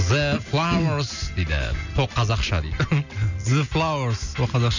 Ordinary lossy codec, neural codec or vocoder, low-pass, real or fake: none; none; 7.2 kHz; real